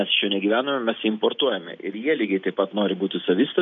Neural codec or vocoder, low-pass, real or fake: none; 7.2 kHz; real